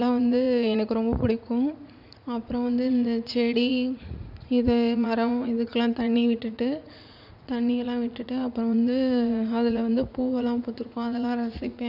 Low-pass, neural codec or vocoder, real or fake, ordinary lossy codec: 5.4 kHz; vocoder, 44.1 kHz, 80 mel bands, Vocos; fake; none